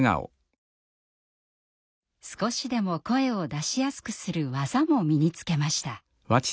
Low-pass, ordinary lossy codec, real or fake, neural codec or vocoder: none; none; real; none